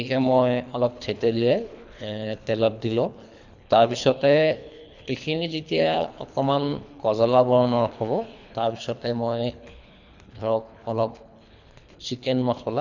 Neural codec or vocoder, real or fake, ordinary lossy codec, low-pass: codec, 24 kHz, 3 kbps, HILCodec; fake; none; 7.2 kHz